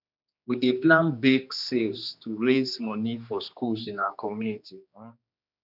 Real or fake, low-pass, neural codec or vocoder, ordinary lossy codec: fake; 5.4 kHz; codec, 16 kHz, 2 kbps, X-Codec, HuBERT features, trained on general audio; none